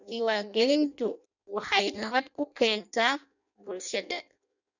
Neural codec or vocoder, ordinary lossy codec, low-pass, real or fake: codec, 16 kHz in and 24 kHz out, 0.6 kbps, FireRedTTS-2 codec; none; 7.2 kHz; fake